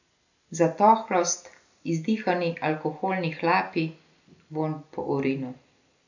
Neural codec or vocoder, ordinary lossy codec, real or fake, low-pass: none; none; real; 7.2 kHz